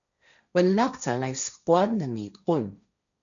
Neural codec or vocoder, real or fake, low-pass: codec, 16 kHz, 1.1 kbps, Voila-Tokenizer; fake; 7.2 kHz